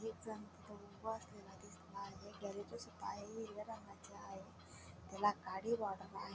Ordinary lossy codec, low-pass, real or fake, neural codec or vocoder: none; none; real; none